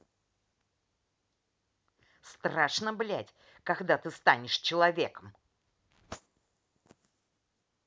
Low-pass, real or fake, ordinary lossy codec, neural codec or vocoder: none; real; none; none